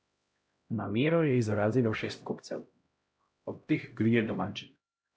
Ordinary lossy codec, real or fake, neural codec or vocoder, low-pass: none; fake; codec, 16 kHz, 0.5 kbps, X-Codec, HuBERT features, trained on LibriSpeech; none